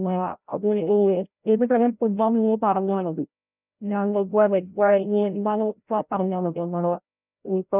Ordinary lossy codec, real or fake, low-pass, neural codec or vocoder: none; fake; 3.6 kHz; codec, 16 kHz, 0.5 kbps, FreqCodec, larger model